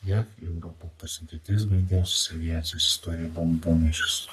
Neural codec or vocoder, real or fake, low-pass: codec, 44.1 kHz, 3.4 kbps, Pupu-Codec; fake; 14.4 kHz